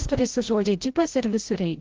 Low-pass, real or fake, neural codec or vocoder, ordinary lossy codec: 7.2 kHz; fake; codec, 16 kHz, 0.5 kbps, FreqCodec, larger model; Opus, 16 kbps